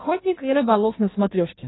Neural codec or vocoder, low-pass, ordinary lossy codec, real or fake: codec, 16 kHz in and 24 kHz out, 1.1 kbps, FireRedTTS-2 codec; 7.2 kHz; AAC, 16 kbps; fake